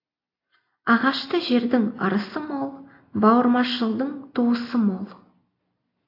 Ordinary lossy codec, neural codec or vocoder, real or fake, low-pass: AAC, 32 kbps; none; real; 5.4 kHz